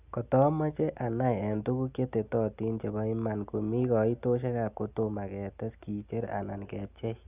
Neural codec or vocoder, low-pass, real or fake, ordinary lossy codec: none; 3.6 kHz; real; none